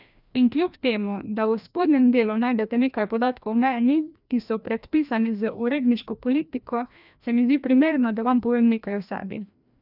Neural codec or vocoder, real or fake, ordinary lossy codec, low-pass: codec, 16 kHz, 1 kbps, FreqCodec, larger model; fake; none; 5.4 kHz